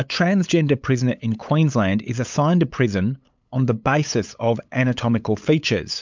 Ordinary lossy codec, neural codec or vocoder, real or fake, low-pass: MP3, 64 kbps; codec, 16 kHz, 8 kbps, FunCodec, trained on LibriTTS, 25 frames a second; fake; 7.2 kHz